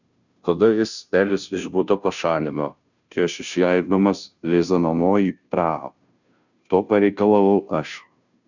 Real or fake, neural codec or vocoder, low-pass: fake; codec, 16 kHz, 0.5 kbps, FunCodec, trained on Chinese and English, 25 frames a second; 7.2 kHz